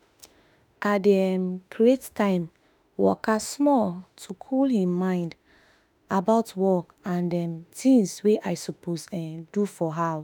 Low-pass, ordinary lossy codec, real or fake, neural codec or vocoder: none; none; fake; autoencoder, 48 kHz, 32 numbers a frame, DAC-VAE, trained on Japanese speech